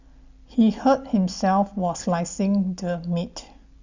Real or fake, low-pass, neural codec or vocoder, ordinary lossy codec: real; 7.2 kHz; none; Opus, 64 kbps